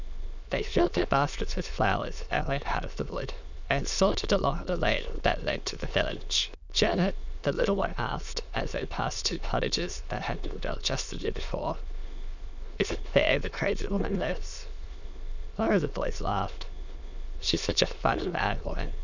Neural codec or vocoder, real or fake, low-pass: autoencoder, 22.05 kHz, a latent of 192 numbers a frame, VITS, trained on many speakers; fake; 7.2 kHz